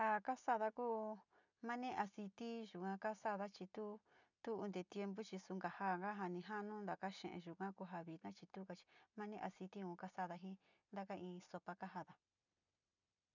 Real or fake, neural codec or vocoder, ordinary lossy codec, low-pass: real; none; none; 7.2 kHz